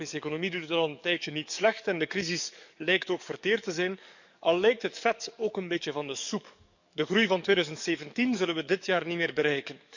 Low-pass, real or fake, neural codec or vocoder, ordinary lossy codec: 7.2 kHz; fake; codec, 44.1 kHz, 7.8 kbps, DAC; none